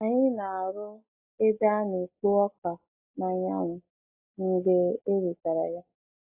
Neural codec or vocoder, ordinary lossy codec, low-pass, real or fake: none; AAC, 32 kbps; 3.6 kHz; real